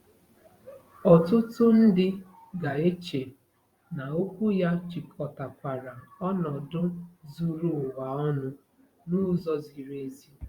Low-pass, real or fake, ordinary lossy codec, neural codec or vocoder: 19.8 kHz; fake; Opus, 64 kbps; vocoder, 44.1 kHz, 128 mel bands every 512 samples, BigVGAN v2